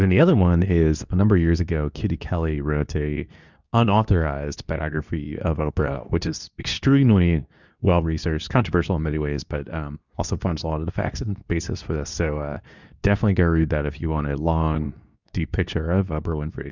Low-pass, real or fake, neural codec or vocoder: 7.2 kHz; fake; codec, 24 kHz, 0.9 kbps, WavTokenizer, medium speech release version 2